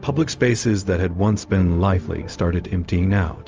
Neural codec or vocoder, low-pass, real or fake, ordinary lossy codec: codec, 16 kHz, 0.4 kbps, LongCat-Audio-Codec; 7.2 kHz; fake; Opus, 24 kbps